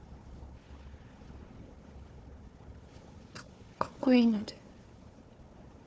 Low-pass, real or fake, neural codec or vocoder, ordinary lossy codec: none; fake; codec, 16 kHz, 4 kbps, FunCodec, trained on Chinese and English, 50 frames a second; none